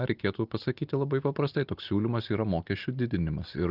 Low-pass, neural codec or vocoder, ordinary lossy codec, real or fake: 5.4 kHz; none; Opus, 32 kbps; real